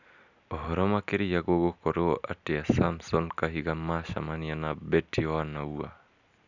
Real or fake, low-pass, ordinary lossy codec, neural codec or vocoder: real; 7.2 kHz; none; none